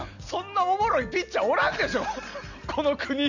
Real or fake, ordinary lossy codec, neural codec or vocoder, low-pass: real; none; none; 7.2 kHz